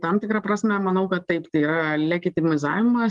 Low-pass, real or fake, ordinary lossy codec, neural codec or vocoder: 10.8 kHz; real; Opus, 24 kbps; none